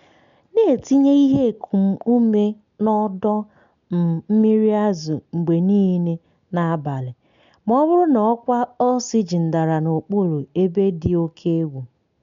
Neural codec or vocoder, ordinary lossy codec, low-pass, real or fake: none; none; 7.2 kHz; real